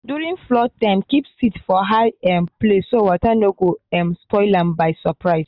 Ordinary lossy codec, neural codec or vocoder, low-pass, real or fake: none; none; 5.4 kHz; real